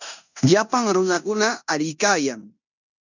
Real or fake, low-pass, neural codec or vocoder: fake; 7.2 kHz; codec, 16 kHz in and 24 kHz out, 0.9 kbps, LongCat-Audio-Codec, fine tuned four codebook decoder